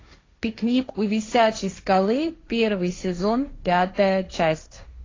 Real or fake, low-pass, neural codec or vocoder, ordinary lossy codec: fake; 7.2 kHz; codec, 16 kHz, 1.1 kbps, Voila-Tokenizer; AAC, 32 kbps